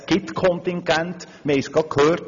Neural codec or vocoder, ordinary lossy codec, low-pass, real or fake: none; none; 7.2 kHz; real